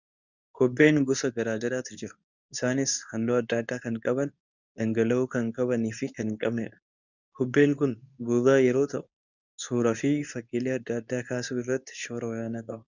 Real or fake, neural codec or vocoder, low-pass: fake; codec, 24 kHz, 0.9 kbps, WavTokenizer, medium speech release version 2; 7.2 kHz